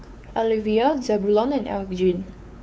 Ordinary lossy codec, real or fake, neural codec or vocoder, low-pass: none; fake; codec, 16 kHz, 4 kbps, X-Codec, WavLM features, trained on Multilingual LibriSpeech; none